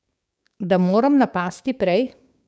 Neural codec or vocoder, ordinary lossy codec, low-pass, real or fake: codec, 16 kHz, 6 kbps, DAC; none; none; fake